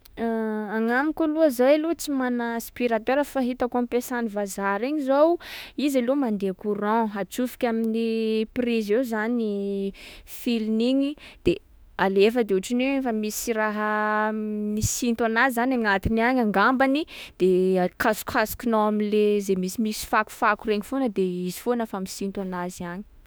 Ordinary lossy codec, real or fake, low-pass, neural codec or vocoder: none; fake; none; autoencoder, 48 kHz, 32 numbers a frame, DAC-VAE, trained on Japanese speech